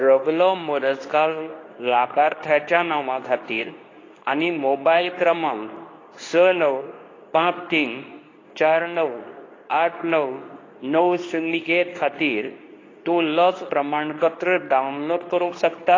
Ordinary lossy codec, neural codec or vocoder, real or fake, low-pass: AAC, 32 kbps; codec, 24 kHz, 0.9 kbps, WavTokenizer, medium speech release version 2; fake; 7.2 kHz